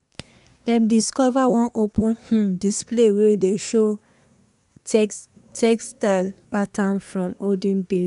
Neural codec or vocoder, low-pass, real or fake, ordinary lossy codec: codec, 24 kHz, 1 kbps, SNAC; 10.8 kHz; fake; none